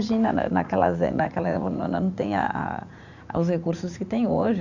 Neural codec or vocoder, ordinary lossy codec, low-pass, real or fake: vocoder, 44.1 kHz, 80 mel bands, Vocos; none; 7.2 kHz; fake